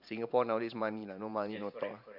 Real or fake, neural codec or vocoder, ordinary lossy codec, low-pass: fake; vocoder, 44.1 kHz, 128 mel bands every 512 samples, BigVGAN v2; none; 5.4 kHz